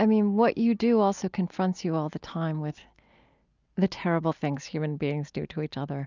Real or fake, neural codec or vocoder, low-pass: real; none; 7.2 kHz